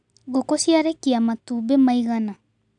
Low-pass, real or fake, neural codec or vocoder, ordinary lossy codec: 9.9 kHz; real; none; none